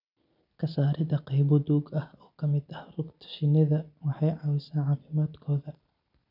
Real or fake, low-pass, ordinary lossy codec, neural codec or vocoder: real; 5.4 kHz; none; none